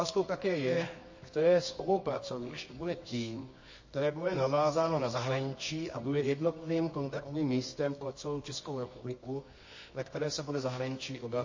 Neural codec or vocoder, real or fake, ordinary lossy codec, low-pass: codec, 24 kHz, 0.9 kbps, WavTokenizer, medium music audio release; fake; MP3, 32 kbps; 7.2 kHz